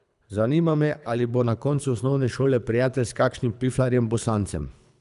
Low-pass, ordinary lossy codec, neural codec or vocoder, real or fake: 10.8 kHz; none; codec, 24 kHz, 3 kbps, HILCodec; fake